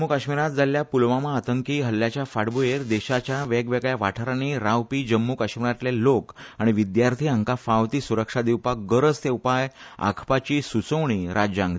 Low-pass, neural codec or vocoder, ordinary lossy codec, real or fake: none; none; none; real